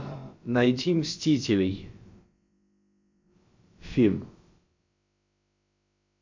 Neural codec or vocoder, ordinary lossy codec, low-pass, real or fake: codec, 16 kHz, about 1 kbps, DyCAST, with the encoder's durations; MP3, 48 kbps; 7.2 kHz; fake